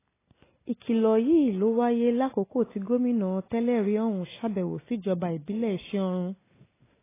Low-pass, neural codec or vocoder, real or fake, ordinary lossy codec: 3.6 kHz; none; real; AAC, 16 kbps